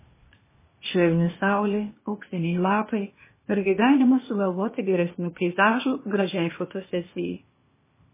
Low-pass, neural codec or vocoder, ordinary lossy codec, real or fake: 3.6 kHz; codec, 16 kHz, 0.8 kbps, ZipCodec; MP3, 16 kbps; fake